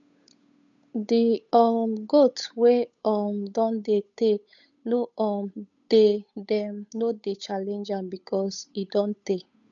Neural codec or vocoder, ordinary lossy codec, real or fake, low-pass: codec, 16 kHz, 8 kbps, FunCodec, trained on Chinese and English, 25 frames a second; none; fake; 7.2 kHz